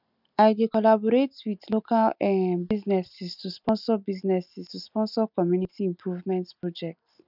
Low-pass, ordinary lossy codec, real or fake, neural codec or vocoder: 5.4 kHz; none; real; none